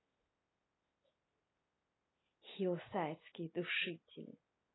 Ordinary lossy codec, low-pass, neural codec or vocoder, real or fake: AAC, 16 kbps; 7.2 kHz; none; real